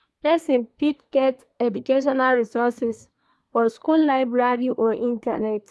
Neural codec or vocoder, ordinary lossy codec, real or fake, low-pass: codec, 24 kHz, 1 kbps, SNAC; none; fake; none